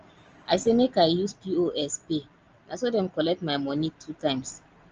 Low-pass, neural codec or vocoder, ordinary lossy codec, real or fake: 7.2 kHz; none; Opus, 24 kbps; real